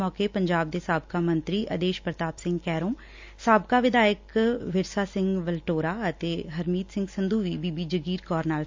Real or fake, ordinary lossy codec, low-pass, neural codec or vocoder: real; MP3, 64 kbps; 7.2 kHz; none